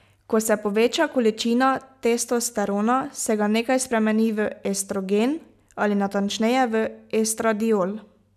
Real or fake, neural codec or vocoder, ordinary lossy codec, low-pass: real; none; none; 14.4 kHz